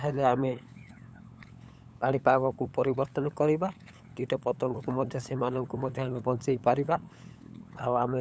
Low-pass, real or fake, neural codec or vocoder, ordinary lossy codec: none; fake; codec, 16 kHz, 8 kbps, FunCodec, trained on LibriTTS, 25 frames a second; none